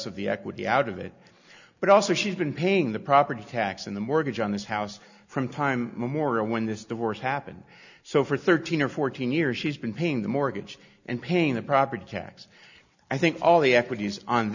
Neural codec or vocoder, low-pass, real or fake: none; 7.2 kHz; real